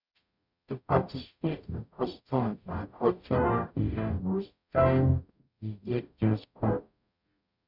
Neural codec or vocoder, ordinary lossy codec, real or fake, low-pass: codec, 44.1 kHz, 0.9 kbps, DAC; none; fake; 5.4 kHz